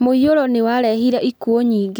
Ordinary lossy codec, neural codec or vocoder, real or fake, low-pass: none; none; real; none